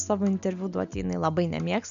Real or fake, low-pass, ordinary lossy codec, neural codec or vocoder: real; 7.2 kHz; MP3, 96 kbps; none